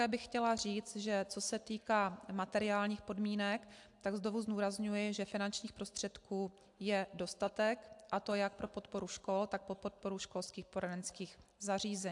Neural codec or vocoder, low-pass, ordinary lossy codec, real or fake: none; 10.8 kHz; AAC, 64 kbps; real